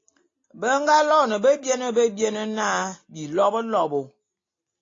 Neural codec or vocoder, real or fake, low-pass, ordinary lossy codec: none; real; 7.2 kHz; AAC, 32 kbps